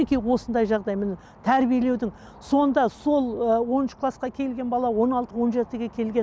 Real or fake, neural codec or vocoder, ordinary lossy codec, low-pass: real; none; none; none